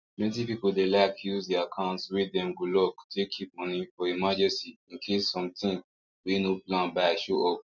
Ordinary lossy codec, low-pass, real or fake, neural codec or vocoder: none; 7.2 kHz; real; none